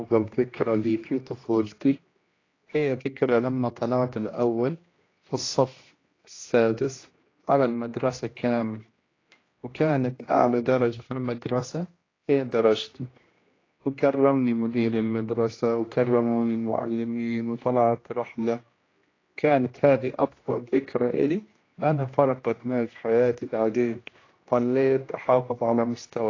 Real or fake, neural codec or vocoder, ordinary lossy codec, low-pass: fake; codec, 16 kHz, 1 kbps, X-Codec, HuBERT features, trained on general audio; AAC, 32 kbps; 7.2 kHz